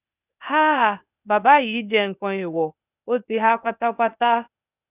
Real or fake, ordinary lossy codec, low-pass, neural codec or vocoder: fake; none; 3.6 kHz; codec, 16 kHz, 0.8 kbps, ZipCodec